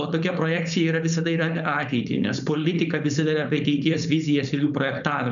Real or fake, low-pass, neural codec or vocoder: fake; 7.2 kHz; codec, 16 kHz, 4.8 kbps, FACodec